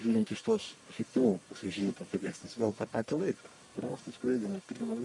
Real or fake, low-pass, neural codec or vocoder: fake; 10.8 kHz; codec, 44.1 kHz, 1.7 kbps, Pupu-Codec